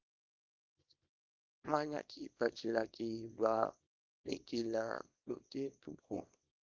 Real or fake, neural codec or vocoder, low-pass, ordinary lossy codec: fake; codec, 24 kHz, 0.9 kbps, WavTokenizer, small release; 7.2 kHz; Opus, 32 kbps